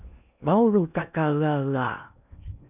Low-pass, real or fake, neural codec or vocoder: 3.6 kHz; fake; codec, 16 kHz in and 24 kHz out, 0.8 kbps, FocalCodec, streaming, 65536 codes